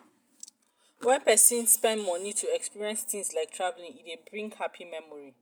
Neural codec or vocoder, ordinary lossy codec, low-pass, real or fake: none; none; none; real